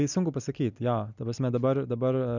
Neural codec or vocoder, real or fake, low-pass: none; real; 7.2 kHz